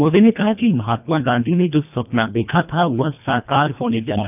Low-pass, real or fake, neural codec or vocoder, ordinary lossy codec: 3.6 kHz; fake; codec, 24 kHz, 1.5 kbps, HILCodec; none